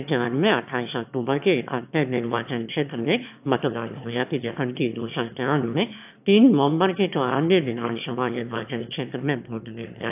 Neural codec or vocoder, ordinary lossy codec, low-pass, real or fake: autoencoder, 22.05 kHz, a latent of 192 numbers a frame, VITS, trained on one speaker; AAC, 32 kbps; 3.6 kHz; fake